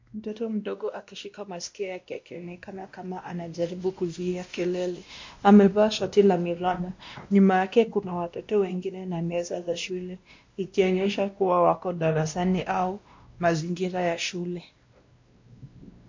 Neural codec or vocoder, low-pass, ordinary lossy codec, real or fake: codec, 16 kHz, 1 kbps, X-Codec, WavLM features, trained on Multilingual LibriSpeech; 7.2 kHz; MP3, 48 kbps; fake